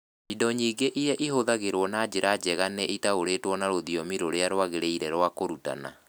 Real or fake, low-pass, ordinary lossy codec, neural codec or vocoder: real; none; none; none